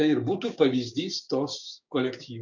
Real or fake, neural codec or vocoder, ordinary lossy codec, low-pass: fake; codec, 16 kHz, 6 kbps, DAC; MP3, 48 kbps; 7.2 kHz